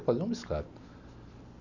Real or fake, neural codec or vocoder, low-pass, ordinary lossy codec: real; none; 7.2 kHz; none